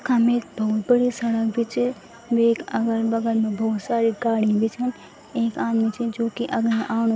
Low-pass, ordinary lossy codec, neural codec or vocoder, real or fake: none; none; none; real